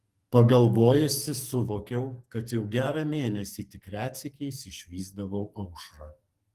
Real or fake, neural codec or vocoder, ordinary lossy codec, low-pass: fake; codec, 44.1 kHz, 2.6 kbps, SNAC; Opus, 24 kbps; 14.4 kHz